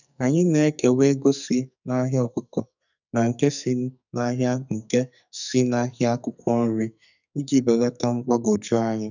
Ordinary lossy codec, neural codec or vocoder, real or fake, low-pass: none; codec, 44.1 kHz, 2.6 kbps, SNAC; fake; 7.2 kHz